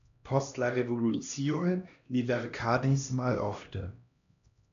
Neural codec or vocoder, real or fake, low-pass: codec, 16 kHz, 1 kbps, X-Codec, HuBERT features, trained on LibriSpeech; fake; 7.2 kHz